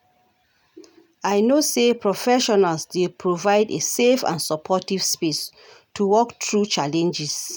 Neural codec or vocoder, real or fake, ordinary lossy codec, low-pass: none; real; none; none